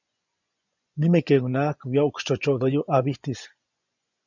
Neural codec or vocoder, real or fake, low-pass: vocoder, 24 kHz, 100 mel bands, Vocos; fake; 7.2 kHz